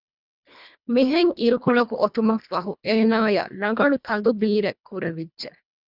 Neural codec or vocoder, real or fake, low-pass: codec, 24 kHz, 1.5 kbps, HILCodec; fake; 5.4 kHz